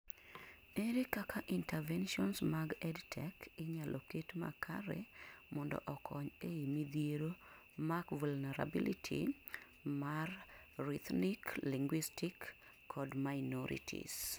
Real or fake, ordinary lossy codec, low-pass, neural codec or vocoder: real; none; none; none